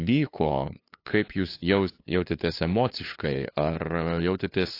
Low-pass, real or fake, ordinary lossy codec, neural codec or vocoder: 5.4 kHz; fake; AAC, 32 kbps; codec, 16 kHz, 2 kbps, FunCodec, trained on LibriTTS, 25 frames a second